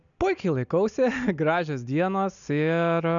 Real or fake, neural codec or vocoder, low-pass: real; none; 7.2 kHz